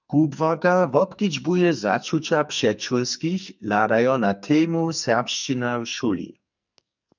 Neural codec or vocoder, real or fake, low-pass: codec, 44.1 kHz, 2.6 kbps, SNAC; fake; 7.2 kHz